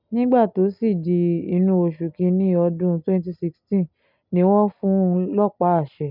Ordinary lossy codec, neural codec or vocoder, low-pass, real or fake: none; none; 5.4 kHz; real